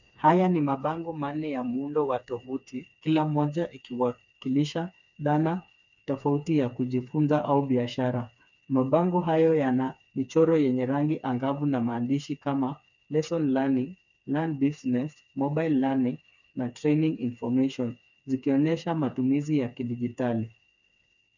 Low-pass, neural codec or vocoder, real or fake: 7.2 kHz; codec, 16 kHz, 4 kbps, FreqCodec, smaller model; fake